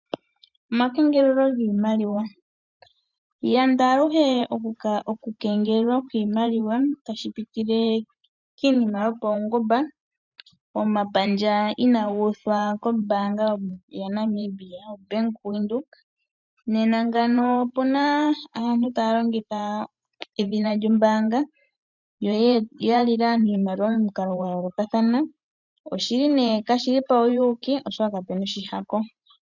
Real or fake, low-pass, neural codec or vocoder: fake; 7.2 kHz; vocoder, 44.1 kHz, 128 mel bands every 512 samples, BigVGAN v2